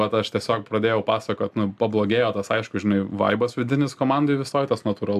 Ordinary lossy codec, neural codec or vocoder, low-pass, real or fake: AAC, 96 kbps; none; 14.4 kHz; real